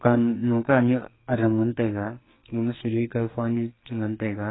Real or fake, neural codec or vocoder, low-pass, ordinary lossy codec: fake; codec, 44.1 kHz, 2.6 kbps, SNAC; 7.2 kHz; AAC, 16 kbps